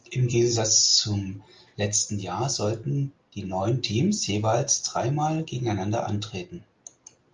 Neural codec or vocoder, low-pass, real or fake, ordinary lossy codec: none; 7.2 kHz; real; Opus, 32 kbps